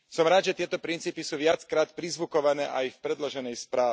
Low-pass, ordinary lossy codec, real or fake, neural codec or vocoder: none; none; real; none